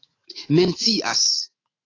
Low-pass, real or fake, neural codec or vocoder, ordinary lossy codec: 7.2 kHz; fake; autoencoder, 48 kHz, 128 numbers a frame, DAC-VAE, trained on Japanese speech; AAC, 32 kbps